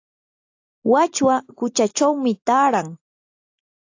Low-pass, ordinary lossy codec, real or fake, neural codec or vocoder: 7.2 kHz; AAC, 48 kbps; real; none